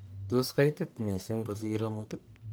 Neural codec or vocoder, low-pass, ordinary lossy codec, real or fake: codec, 44.1 kHz, 1.7 kbps, Pupu-Codec; none; none; fake